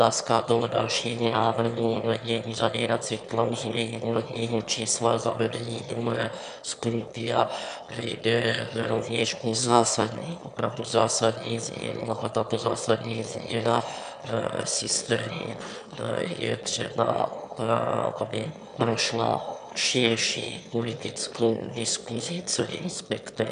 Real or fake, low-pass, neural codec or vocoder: fake; 9.9 kHz; autoencoder, 22.05 kHz, a latent of 192 numbers a frame, VITS, trained on one speaker